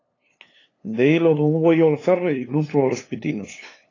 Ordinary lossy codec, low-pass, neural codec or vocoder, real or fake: AAC, 32 kbps; 7.2 kHz; codec, 16 kHz, 2 kbps, FunCodec, trained on LibriTTS, 25 frames a second; fake